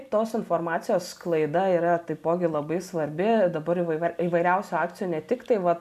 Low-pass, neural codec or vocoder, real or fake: 14.4 kHz; none; real